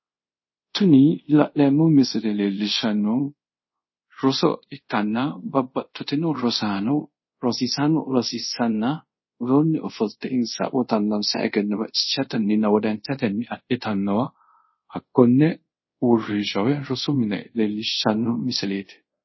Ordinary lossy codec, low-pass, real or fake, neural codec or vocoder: MP3, 24 kbps; 7.2 kHz; fake; codec, 24 kHz, 0.5 kbps, DualCodec